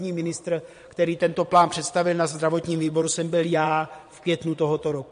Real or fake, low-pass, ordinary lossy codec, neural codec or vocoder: fake; 9.9 kHz; MP3, 48 kbps; vocoder, 22.05 kHz, 80 mel bands, Vocos